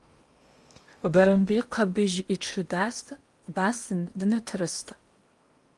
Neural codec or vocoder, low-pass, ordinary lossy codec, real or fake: codec, 16 kHz in and 24 kHz out, 0.8 kbps, FocalCodec, streaming, 65536 codes; 10.8 kHz; Opus, 32 kbps; fake